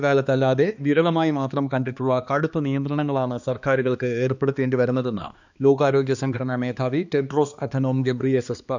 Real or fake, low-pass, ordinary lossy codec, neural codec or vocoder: fake; 7.2 kHz; none; codec, 16 kHz, 2 kbps, X-Codec, HuBERT features, trained on balanced general audio